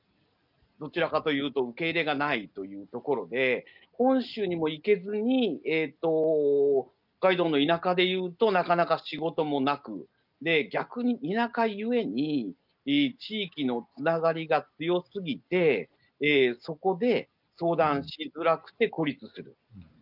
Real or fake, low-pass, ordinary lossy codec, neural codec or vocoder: real; 5.4 kHz; none; none